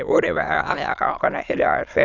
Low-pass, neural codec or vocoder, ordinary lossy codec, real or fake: 7.2 kHz; autoencoder, 22.05 kHz, a latent of 192 numbers a frame, VITS, trained on many speakers; none; fake